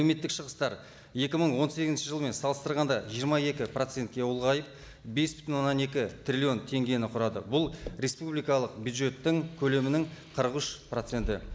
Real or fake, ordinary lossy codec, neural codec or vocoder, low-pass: real; none; none; none